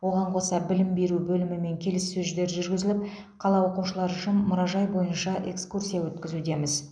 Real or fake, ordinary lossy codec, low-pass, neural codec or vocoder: real; none; 9.9 kHz; none